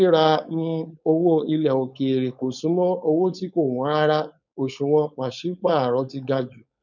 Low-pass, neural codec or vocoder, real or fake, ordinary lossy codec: 7.2 kHz; codec, 16 kHz, 4.8 kbps, FACodec; fake; none